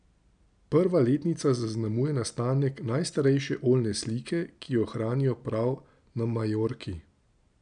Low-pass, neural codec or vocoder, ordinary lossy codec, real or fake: 9.9 kHz; none; none; real